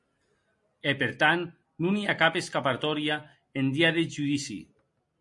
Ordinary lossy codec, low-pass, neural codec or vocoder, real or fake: MP3, 48 kbps; 10.8 kHz; none; real